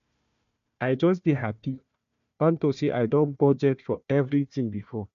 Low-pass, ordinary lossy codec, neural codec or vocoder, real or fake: 7.2 kHz; none; codec, 16 kHz, 1 kbps, FunCodec, trained on Chinese and English, 50 frames a second; fake